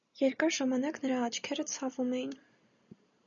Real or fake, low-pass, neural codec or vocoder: real; 7.2 kHz; none